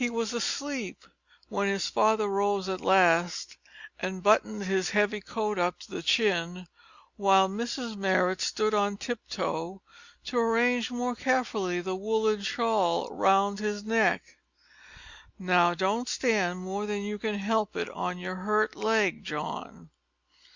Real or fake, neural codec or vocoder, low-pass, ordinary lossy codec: real; none; 7.2 kHz; Opus, 64 kbps